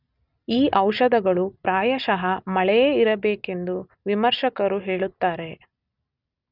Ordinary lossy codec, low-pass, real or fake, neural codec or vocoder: none; 5.4 kHz; real; none